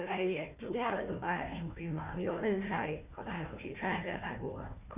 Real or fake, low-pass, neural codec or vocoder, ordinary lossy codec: fake; 3.6 kHz; codec, 16 kHz, 1 kbps, FunCodec, trained on Chinese and English, 50 frames a second; none